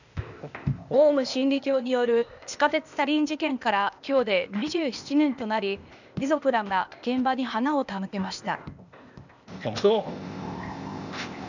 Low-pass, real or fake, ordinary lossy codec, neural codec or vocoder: 7.2 kHz; fake; none; codec, 16 kHz, 0.8 kbps, ZipCodec